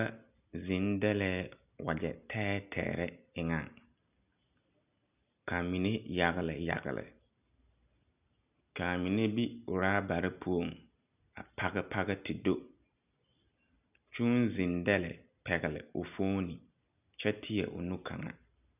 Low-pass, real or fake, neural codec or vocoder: 3.6 kHz; real; none